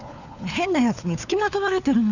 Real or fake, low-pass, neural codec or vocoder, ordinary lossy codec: fake; 7.2 kHz; codec, 16 kHz, 4 kbps, FunCodec, trained on LibriTTS, 50 frames a second; none